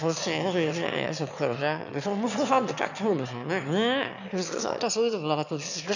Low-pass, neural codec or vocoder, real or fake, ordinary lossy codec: 7.2 kHz; autoencoder, 22.05 kHz, a latent of 192 numbers a frame, VITS, trained on one speaker; fake; none